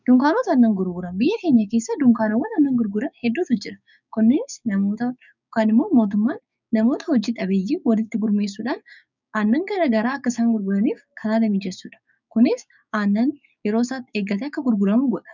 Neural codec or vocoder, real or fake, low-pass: codec, 16 kHz, 6 kbps, DAC; fake; 7.2 kHz